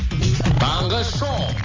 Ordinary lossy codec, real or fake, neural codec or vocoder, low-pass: Opus, 32 kbps; real; none; 7.2 kHz